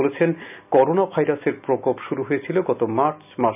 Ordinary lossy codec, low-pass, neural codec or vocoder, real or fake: none; 3.6 kHz; none; real